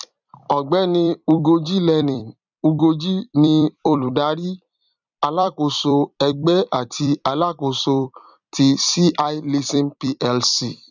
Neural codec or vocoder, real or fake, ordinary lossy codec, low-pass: vocoder, 44.1 kHz, 128 mel bands every 256 samples, BigVGAN v2; fake; none; 7.2 kHz